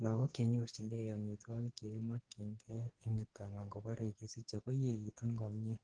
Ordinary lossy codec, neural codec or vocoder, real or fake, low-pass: Opus, 16 kbps; codec, 44.1 kHz, 2.6 kbps, SNAC; fake; 9.9 kHz